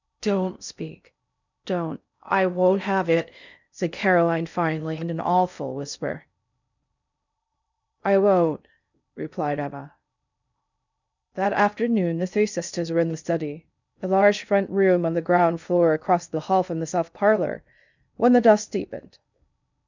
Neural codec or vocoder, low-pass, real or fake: codec, 16 kHz in and 24 kHz out, 0.6 kbps, FocalCodec, streaming, 2048 codes; 7.2 kHz; fake